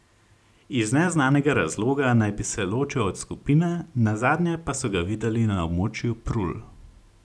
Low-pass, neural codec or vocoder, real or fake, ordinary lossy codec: none; none; real; none